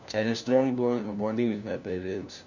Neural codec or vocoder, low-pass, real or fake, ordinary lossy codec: codec, 16 kHz, 1 kbps, FunCodec, trained on LibriTTS, 50 frames a second; 7.2 kHz; fake; none